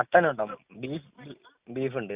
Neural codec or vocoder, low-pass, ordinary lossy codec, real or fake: none; 3.6 kHz; Opus, 64 kbps; real